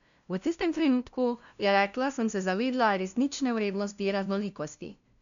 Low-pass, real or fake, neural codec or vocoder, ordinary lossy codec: 7.2 kHz; fake; codec, 16 kHz, 0.5 kbps, FunCodec, trained on LibriTTS, 25 frames a second; none